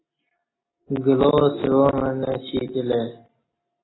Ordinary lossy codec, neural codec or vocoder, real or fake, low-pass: AAC, 16 kbps; none; real; 7.2 kHz